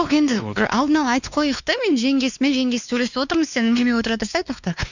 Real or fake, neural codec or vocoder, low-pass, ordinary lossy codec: fake; codec, 16 kHz, 2 kbps, X-Codec, WavLM features, trained on Multilingual LibriSpeech; 7.2 kHz; none